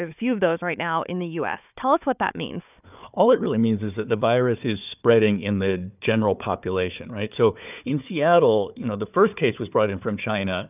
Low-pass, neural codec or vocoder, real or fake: 3.6 kHz; codec, 16 kHz, 4 kbps, FunCodec, trained on Chinese and English, 50 frames a second; fake